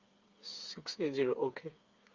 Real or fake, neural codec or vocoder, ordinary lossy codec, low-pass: fake; codec, 16 kHz, 4 kbps, FreqCodec, smaller model; Opus, 32 kbps; 7.2 kHz